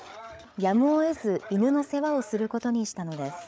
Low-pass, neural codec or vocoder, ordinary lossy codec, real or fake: none; codec, 16 kHz, 8 kbps, FreqCodec, larger model; none; fake